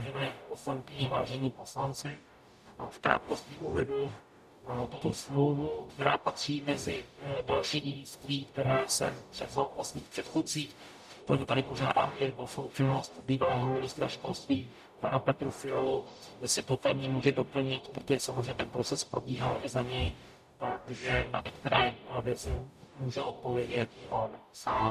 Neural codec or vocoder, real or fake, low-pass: codec, 44.1 kHz, 0.9 kbps, DAC; fake; 14.4 kHz